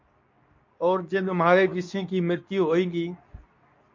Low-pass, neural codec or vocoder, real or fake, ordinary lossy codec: 7.2 kHz; codec, 24 kHz, 0.9 kbps, WavTokenizer, medium speech release version 2; fake; MP3, 48 kbps